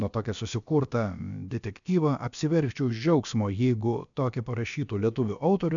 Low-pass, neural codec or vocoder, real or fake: 7.2 kHz; codec, 16 kHz, about 1 kbps, DyCAST, with the encoder's durations; fake